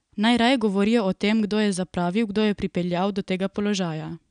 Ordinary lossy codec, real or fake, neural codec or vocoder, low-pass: none; real; none; 9.9 kHz